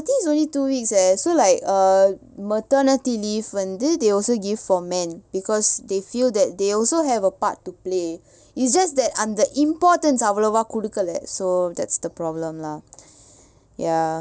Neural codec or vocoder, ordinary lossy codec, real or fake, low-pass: none; none; real; none